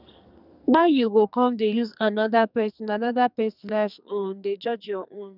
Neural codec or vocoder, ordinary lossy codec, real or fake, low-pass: codec, 44.1 kHz, 2.6 kbps, SNAC; none; fake; 5.4 kHz